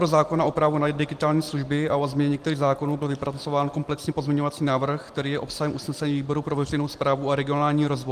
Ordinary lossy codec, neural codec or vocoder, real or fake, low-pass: Opus, 24 kbps; none; real; 14.4 kHz